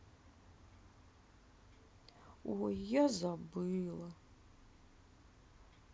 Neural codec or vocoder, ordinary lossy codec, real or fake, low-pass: none; none; real; none